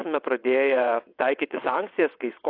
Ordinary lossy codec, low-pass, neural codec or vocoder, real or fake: AAC, 32 kbps; 5.4 kHz; vocoder, 22.05 kHz, 80 mel bands, WaveNeXt; fake